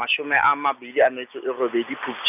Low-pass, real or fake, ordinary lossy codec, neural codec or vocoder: 3.6 kHz; real; none; none